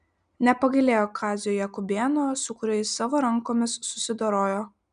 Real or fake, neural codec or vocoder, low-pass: real; none; 9.9 kHz